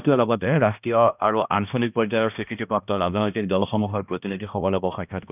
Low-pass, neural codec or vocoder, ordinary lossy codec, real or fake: 3.6 kHz; codec, 16 kHz, 1 kbps, X-Codec, HuBERT features, trained on balanced general audio; none; fake